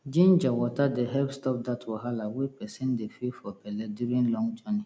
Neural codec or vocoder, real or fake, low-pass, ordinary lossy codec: none; real; none; none